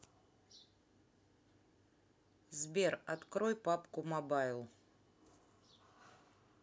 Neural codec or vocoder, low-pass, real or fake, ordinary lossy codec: none; none; real; none